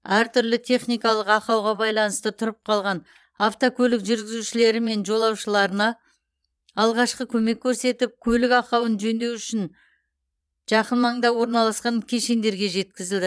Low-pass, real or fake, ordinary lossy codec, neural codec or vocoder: none; fake; none; vocoder, 22.05 kHz, 80 mel bands, Vocos